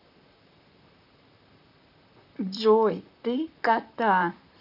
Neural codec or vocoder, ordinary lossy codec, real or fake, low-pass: vocoder, 44.1 kHz, 128 mel bands, Pupu-Vocoder; none; fake; 5.4 kHz